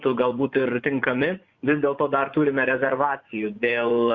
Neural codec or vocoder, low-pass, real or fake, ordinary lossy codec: vocoder, 24 kHz, 100 mel bands, Vocos; 7.2 kHz; fake; Opus, 64 kbps